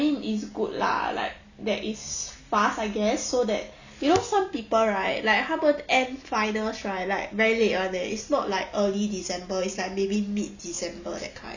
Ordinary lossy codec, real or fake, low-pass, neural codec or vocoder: none; real; 7.2 kHz; none